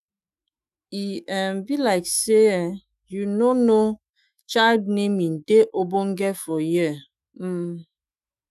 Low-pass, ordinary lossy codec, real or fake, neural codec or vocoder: 14.4 kHz; none; fake; autoencoder, 48 kHz, 128 numbers a frame, DAC-VAE, trained on Japanese speech